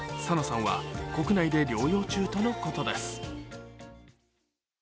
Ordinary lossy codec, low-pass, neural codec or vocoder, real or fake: none; none; none; real